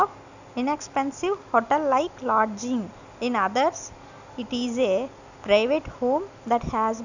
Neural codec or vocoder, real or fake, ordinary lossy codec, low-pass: none; real; none; 7.2 kHz